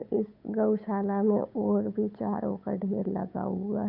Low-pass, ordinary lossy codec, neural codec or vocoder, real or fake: 5.4 kHz; none; codec, 16 kHz, 8 kbps, FunCodec, trained on Chinese and English, 25 frames a second; fake